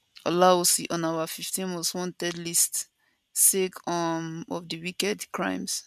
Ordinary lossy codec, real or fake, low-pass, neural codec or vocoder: none; real; 14.4 kHz; none